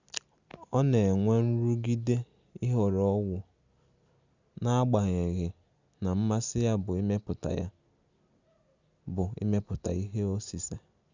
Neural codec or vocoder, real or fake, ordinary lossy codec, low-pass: none; real; Opus, 64 kbps; 7.2 kHz